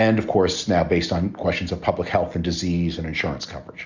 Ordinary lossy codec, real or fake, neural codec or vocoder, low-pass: Opus, 64 kbps; real; none; 7.2 kHz